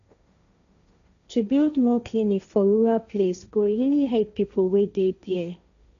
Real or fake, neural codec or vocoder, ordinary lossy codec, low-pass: fake; codec, 16 kHz, 1.1 kbps, Voila-Tokenizer; none; 7.2 kHz